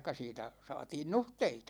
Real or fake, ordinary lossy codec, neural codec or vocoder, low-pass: real; none; none; none